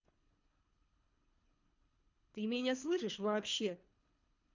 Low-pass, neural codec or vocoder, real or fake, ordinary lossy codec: 7.2 kHz; codec, 24 kHz, 3 kbps, HILCodec; fake; MP3, 64 kbps